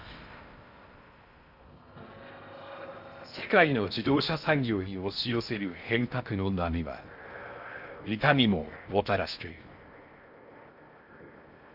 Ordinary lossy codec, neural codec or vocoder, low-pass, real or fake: Opus, 64 kbps; codec, 16 kHz in and 24 kHz out, 0.6 kbps, FocalCodec, streaming, 2048 codes; 5.4 kHz; fake